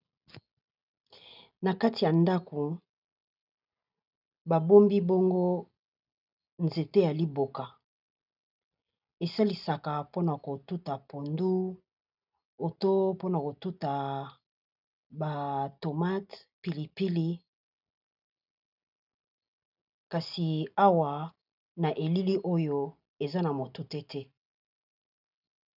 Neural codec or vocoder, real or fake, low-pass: none; real; 5.4 kHz